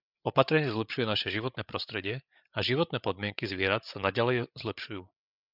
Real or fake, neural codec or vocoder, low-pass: fake; codec, 16 kHz, 8 kbps, FreqCodec, larger model; 5.4 kHz